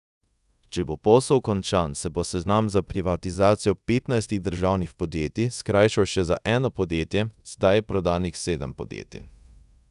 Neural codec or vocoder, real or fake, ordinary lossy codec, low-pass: codec, 24 kHz, 0.5 kbps, DualCodec; fake; none; 10.8 kHz